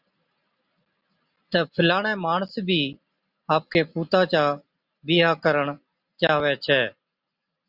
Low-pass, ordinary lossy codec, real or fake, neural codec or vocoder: 5.4 kHz; Opus, 64 kbps; real; none